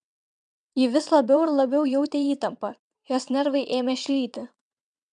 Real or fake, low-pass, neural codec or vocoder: fake; 9.9 kHz; vocoder, 22.05 kHz, 80 mel bands, WaveNeXt